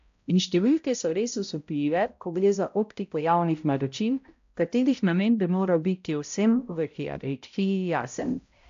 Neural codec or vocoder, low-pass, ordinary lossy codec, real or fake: codec, 16 kHz, 0.5 kbps, X-Codec, HuBERT features, trained on balanced general audio; 7.2 kHz; AAC, 64 kbps; fake